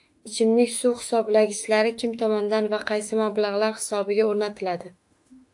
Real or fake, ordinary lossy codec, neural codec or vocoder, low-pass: fake; AAC, 64 kbps; autoencoder, 48 kHz, 32 numbers a frame, DAC-VAE, trained on Japanese speech; 10.8 kHz